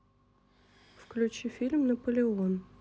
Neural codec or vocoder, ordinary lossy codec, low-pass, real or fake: none; none; none; real